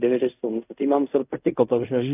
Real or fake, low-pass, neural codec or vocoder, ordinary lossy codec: fake; 3.6 kHz; codec, 16 kHz in and 24 kHz out, 0.4 kbps, LongCat-Audio-Codec, fine tuned four codebook decoder; AAC, 32 kbps